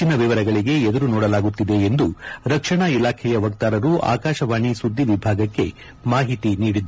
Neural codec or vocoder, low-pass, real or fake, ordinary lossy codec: none; none; real; none